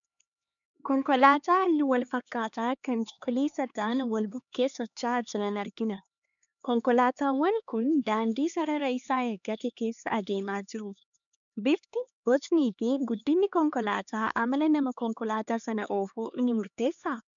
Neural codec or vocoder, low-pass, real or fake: codec, 16 kHz, 2 kbps, X-Codec, HuBERT features, trained on LibriSpeech; 7.2 kHz; fake